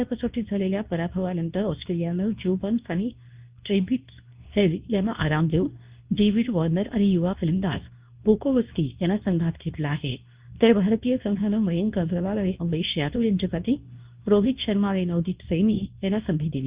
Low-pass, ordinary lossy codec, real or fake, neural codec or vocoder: 3.6 kHz; Opus, 24 kbps; fake; codec, 24 kHz, 0.9 kbps, WavTokenizer, medium speech release version 2